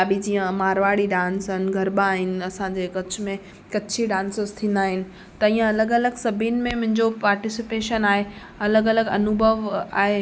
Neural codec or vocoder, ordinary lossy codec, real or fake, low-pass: none; none; real; none